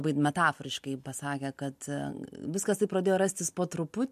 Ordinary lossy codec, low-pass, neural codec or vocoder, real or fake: MP3, 64 kbps; 14.4 kHz; none; real